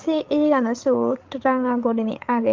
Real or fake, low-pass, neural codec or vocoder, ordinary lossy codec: fake; 7.2 kHz; codec, 16 kHz, 16 kbps, FreqCodec, larger model; Opus, 24 kbps